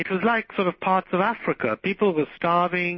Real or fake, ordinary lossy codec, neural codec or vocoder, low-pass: real; MP3, 24 kbps; none; 7.2 kHz